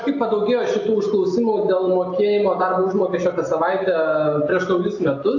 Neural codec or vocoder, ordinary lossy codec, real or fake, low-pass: none; AAC, 48 kbps; real; 7.2 kHz